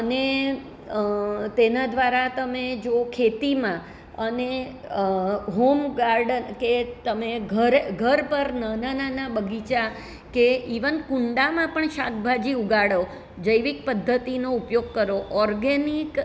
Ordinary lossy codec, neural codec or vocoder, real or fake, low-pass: none; none; real; none